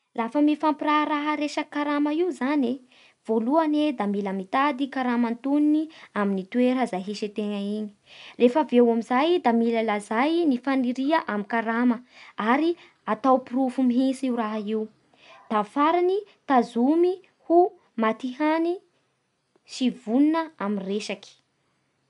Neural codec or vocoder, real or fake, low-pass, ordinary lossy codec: none; real; 10.8 kHz; none